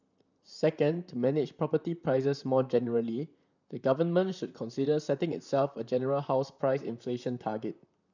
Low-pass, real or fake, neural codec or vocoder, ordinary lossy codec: 7.2 kHz; fake; vocoder, 44.1 kHz, 128 mel bands, Pupu-Vocoder; none